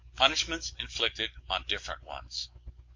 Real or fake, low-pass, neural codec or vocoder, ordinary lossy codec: fake; 7.2 kHz; codec, 44.1 kHz, 7.8 kbps, DAC; MP3, 48 kbps